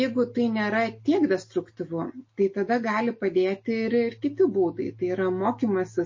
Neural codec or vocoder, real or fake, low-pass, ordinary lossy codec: none; real; 7.2 kHz; MP3, 32 kbps